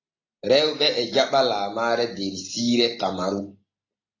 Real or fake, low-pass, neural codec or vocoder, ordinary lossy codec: real; 7.2 kHz; none; AAC, 32 kbps